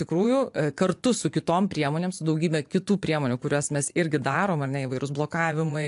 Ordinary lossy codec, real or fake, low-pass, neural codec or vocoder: AAC, 64 kbps; fake; 10.8 kHz; vocoder, 24 kHz, 100 mel bands, Vocos